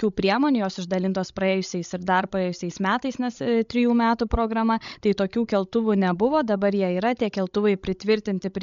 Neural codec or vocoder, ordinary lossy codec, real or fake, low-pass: codec, 16 kHz, 16 kbps, FreqCodec, larger model; MP3, 64 kbps; fake; 7.2 kHz